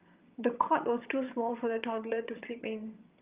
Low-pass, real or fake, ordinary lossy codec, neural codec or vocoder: 3.6 kHz; fake; Opus, 24 kbps; vocoder, 22.05 kHz, 80 mel bands, HiFi-GAN